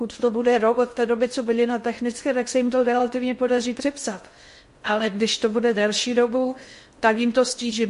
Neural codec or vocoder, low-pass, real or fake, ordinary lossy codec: codec, 16 kHz in and 24 kHz out, 0.6 kbps, FocalCodec, streaming, 2048 codes; 10.8 kHz; fake; MP3, 48 kbps